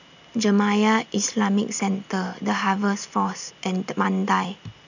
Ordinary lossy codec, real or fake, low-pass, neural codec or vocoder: none; real; 7.2 kHz; none